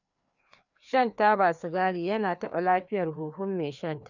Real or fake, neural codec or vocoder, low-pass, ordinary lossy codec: fake; codec, 16 kHz, 2 kbps, FreqCodec, larger model; 7.2 kHz; none